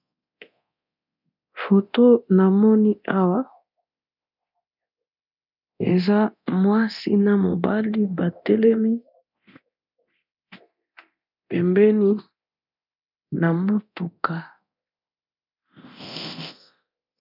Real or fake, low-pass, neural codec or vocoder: fake; 5.4 kHz; codec, 24 kHz, 0.9 kbps, DualCodec